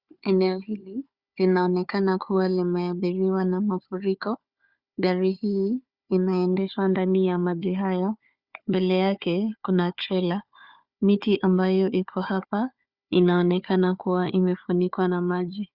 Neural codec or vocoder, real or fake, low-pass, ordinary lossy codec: codec, 16 kHz, 4 kbps, FunCodec, trained on Chinese and English, 50 frames a second; fake; 5.4 kHz; Opus, 64 kbps